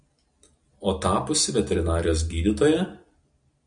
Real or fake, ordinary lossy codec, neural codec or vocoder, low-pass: real; MP3, 96 kbps; none; 9.9 kHz